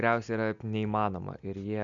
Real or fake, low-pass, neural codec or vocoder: real; 7.2 kHz; none